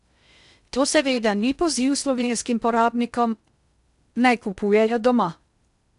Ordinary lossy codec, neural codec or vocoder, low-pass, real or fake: none; codec, 16 kHz in and 24 kHz out, 0.6 kbps, FocalCodec, streaming, 2048 codes; 10.8 kHz; fake